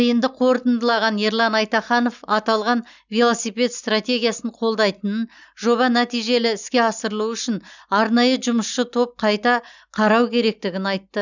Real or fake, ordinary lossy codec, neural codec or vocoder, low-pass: real; none; none; 7.2 kHz